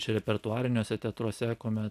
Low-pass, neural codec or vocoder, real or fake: 14.4 kHz; none; real